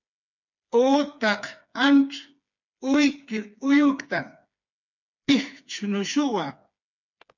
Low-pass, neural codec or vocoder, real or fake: 7.2 kHz; codec, 16 kHz, 4 kbps, FreqCodec, smaller model; fake